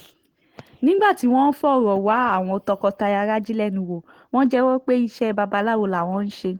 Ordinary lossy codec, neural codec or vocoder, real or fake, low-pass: Opus, 16 kbps; none; real; 19.8 kHz